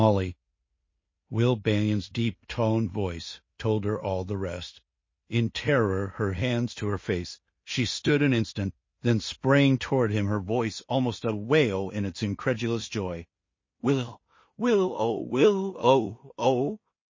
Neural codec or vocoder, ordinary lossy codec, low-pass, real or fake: codec, 16 kHz in and 24 kHz out, 0.4 kbps, LongCat-Audio-Codec, two codebook decoder; MP3, 32 kbps; 7.2 kHz; fake